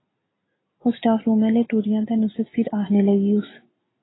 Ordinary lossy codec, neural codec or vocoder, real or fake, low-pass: AAC, 16 kbps; none; real; 7.2 kHz